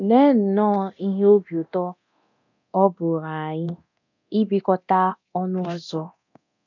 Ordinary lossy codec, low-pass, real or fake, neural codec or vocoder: none; 7.2 kHz; fake; codec, 24 kHz, 0.9 kbps, DualCodec